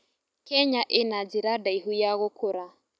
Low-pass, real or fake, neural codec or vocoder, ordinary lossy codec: none; real; none; none